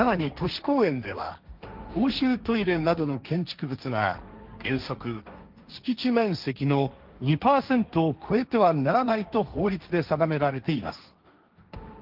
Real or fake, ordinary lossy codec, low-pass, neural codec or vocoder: fake; Opus, 24 kbps; 5.4 kHz; codec, 16 kHz, 1.1 kbps, Voila-Tokenizer